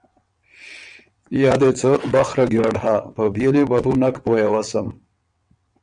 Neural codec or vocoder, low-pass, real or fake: vocoder, 22.05 kHz, 80 mel bands, WaveNeXt; 9.9 kHz; fake